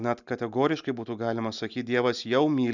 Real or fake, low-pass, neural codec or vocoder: real; 7.2 kHz; none